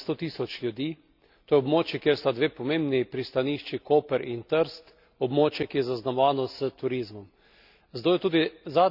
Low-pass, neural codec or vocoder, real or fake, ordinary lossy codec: 5.4 kHz; none; real; none